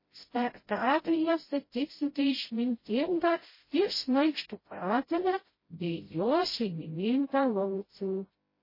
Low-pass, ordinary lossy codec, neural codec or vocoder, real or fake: 5.4 kHz; MP3, 24 kbps; codec, 16 kHz, 0.5 kbps, FreqCodec, smaller model; fake